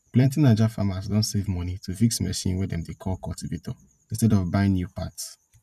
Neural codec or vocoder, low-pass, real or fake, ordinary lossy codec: vocoder, 44.1 kHz, 128 mel bands every 256 samples, BigVGAN v2; 14.4 kHz; fake; none